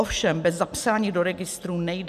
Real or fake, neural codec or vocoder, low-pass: real; none; 14.4 kHz